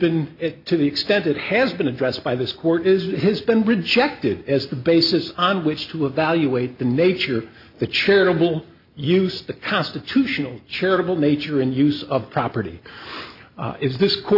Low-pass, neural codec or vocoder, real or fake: 5.4 kHz; none; real